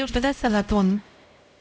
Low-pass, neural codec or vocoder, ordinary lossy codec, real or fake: none; codec, 16 kHz, 0.5 kbps, X-Codec, HuBERT features, trained on LibriSpeech; none; fake